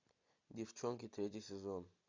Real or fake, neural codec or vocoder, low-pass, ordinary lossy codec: real; none; 7.2 kHz; MP3, 64 kbps